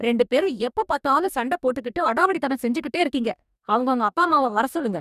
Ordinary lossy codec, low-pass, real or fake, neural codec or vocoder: none; 14.4 kHz; fake; codec, 44.1 kHz, 2.6 kbps, DAC